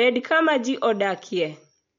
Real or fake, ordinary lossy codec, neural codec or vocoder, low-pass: real; MP3, 48 kbps; none; 7.2 kHz